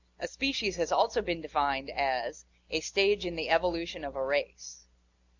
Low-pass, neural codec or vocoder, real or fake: 7.2 kHz; none; real